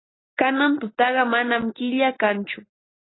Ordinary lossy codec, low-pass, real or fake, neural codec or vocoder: AAC, 16 kbps; 7.2 kHz; real; none